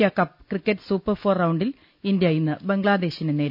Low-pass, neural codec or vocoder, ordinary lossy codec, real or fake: 5.4 kHz; none; none; real